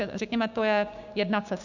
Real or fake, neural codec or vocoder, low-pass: fake; codec, 16 kHz, 6 kbps, DAC; 7.2 kHz